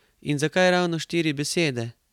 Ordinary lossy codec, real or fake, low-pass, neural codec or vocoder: none; real; 19.8 kHz; none